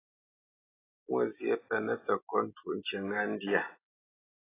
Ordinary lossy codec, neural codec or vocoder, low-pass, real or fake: AAC, 16 kbps; none; 3.6 kHz; real